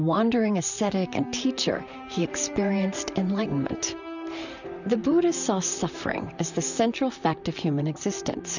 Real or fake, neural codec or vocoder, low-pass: fake; vocoder, 44.1 kHz, 128 mel bands, Pupu-Vocoder; 7.2 kHz